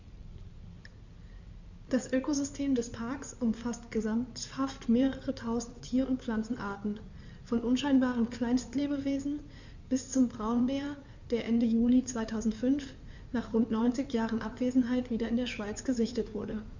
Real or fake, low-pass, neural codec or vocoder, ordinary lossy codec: fake; 7.2 kHz; codec, 16 kHz in and 24 kHz out, 2.2 kbps, FireRedTTS-2 codec; none